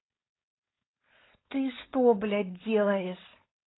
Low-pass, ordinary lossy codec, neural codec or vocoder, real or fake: 7.2 kHz; AAC, 16 kbps; codec, 16 kHz, 4.8 kbps, FACodec; fake